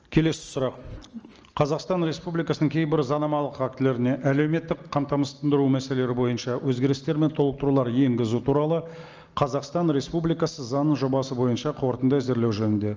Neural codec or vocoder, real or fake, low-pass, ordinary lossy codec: none; real; 7.2 kHz; Opus, 24 kbps